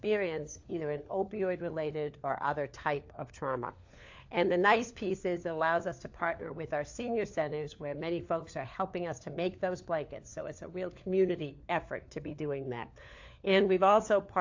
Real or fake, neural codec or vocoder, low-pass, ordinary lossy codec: fake; codec, 16 kHz, 4 kbps, FunCodec, trained on LibriTTS, 50 frames a second; 7.2 kHz; AAC, 48 kbps